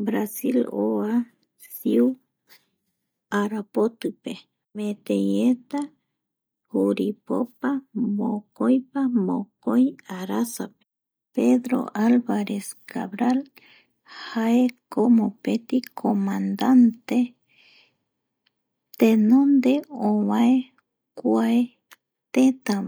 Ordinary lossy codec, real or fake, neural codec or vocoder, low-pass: none; real; none; none